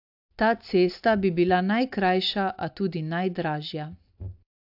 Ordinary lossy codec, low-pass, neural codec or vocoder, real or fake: AAC, 48 kbps; 5.4 kHz; none; real